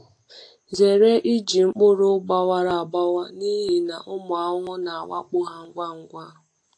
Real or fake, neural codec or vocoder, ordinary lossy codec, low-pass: real; none; AAC, 48 kbps; 9.9 kHz